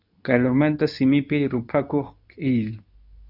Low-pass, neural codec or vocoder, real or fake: 5.4 kHz; codec, 24 kHz, 0.9 kbps, WavTokenizer, medium speech release version 2; fake